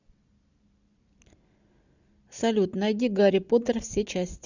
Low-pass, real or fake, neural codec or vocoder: 7.2 kHz; real; none